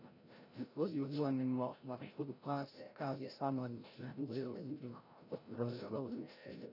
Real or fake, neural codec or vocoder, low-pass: fake; codec, 16 kHz, 0.5 kbps, FreqCodec, larger model; 5.4 kHz